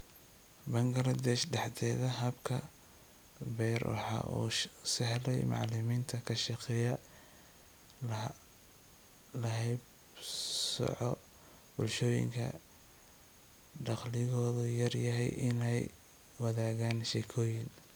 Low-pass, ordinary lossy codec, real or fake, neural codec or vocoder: none; none; real; none